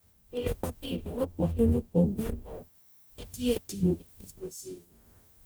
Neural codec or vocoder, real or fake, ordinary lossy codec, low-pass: codec, 44.1 kHz, 0.9 kbps, DAC; fake; none; none